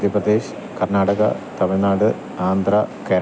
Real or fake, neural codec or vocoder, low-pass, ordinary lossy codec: real; none; none; none